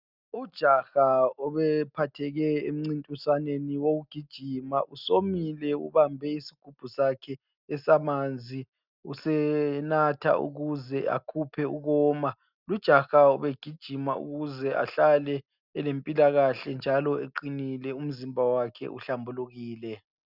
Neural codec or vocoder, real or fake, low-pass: none; real; 5.4 kHz